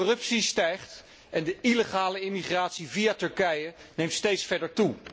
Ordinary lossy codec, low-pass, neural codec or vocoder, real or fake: none; none; none; real